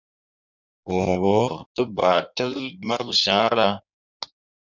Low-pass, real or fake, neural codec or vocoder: 7.2 kHz; fake; codec, 16 kHz in and 24 kHz out, 1.1 kbps, FireRedTTS-2 codec